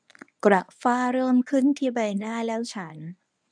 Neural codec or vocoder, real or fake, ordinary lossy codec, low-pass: codec, 24 kHz, 0.9 kbps, WavTokenizer, medium speech release version 1; fake; none; 9.9 kHz